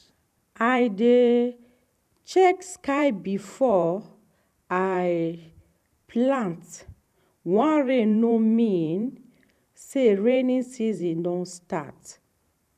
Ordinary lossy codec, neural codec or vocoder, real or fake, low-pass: none; vocoder, 44.1 kHz, 128 mel bands every 512 samples, BigVGAN v2; fake; 14.4 kHz